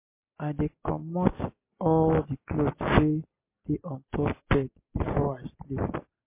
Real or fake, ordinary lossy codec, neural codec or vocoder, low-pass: real; MP3, 24 kbps; none; 3.6 kHz